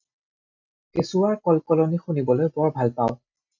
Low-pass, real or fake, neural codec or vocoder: 7.2 kHz; real; none